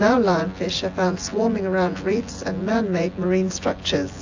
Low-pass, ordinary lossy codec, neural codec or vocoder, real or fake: 7.2 kHz; AAC, 48 kbps; vocoder, 24 kHz, 100 mel bands, Vocos; fake